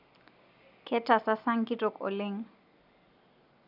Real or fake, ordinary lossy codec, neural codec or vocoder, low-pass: real; none; none; 5.4 kHz